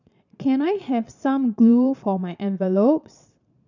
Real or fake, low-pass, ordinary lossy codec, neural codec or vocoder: fake; 7.2 kHz; none; vocoder, 22.05 kHz, 80 mel bands, Vocos